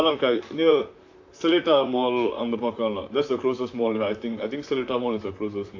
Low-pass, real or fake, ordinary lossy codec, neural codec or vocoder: 7.2 kHz; fake; AAC, 48 kbps; vocoder, 44.1 kHz, 128 mel bands, Pupu-Vocoder